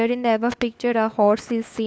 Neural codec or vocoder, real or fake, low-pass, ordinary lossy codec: codec, 16 kHz, 4 kbps, FunCodec, trained on LibriTTS, 50 frames a second; fake; none; none